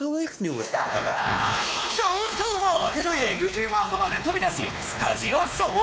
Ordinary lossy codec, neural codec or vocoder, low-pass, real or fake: none; codec, 16 kHz, 2 kbps, X-Codec, WavLM features, trained on Multilingual LibriSpeech; none; fake